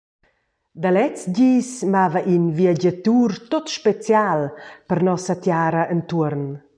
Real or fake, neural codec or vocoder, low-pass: real; none; 9.9 kHz